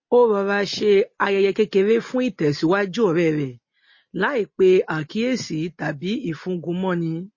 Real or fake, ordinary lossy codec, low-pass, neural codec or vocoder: real; MP3, 32 kbps; 7.2 kHz; none